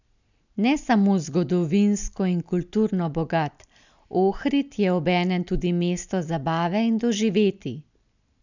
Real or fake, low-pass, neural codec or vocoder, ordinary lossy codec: real; 7.2 kHz; none; none